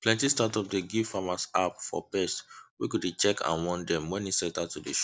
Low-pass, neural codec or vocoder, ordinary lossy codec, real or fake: none; none; none; real